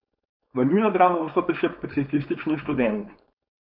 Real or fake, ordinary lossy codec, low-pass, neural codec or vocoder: fake; none; 5.4 kHz; codec, 16 kHz, 4.8 kbps, FACodec